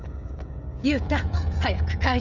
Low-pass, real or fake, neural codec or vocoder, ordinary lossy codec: 7.2 kHz; fake; codec, 16 kHz, 16 kbps, FreqCodec, smaller model; none